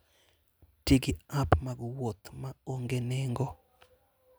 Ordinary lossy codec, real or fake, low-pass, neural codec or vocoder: none; real; none; none